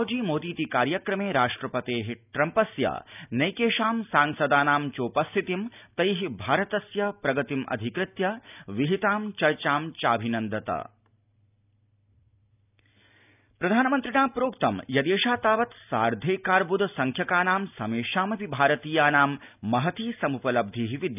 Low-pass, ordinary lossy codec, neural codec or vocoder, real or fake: 3.6 kHz; none; none; real